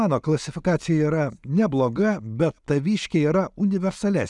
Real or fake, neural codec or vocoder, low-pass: real; none; 10.8 kHz